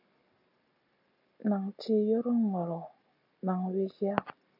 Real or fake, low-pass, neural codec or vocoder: real; 5.4 kHz; none